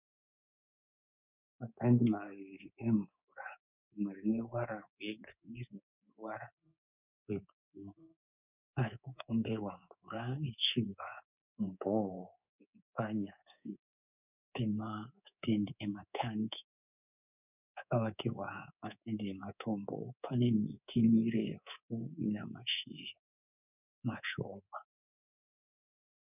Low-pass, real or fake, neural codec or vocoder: 3.6 kHz; fake; codec, 24 kHz, 3.1 kbps, DualCodec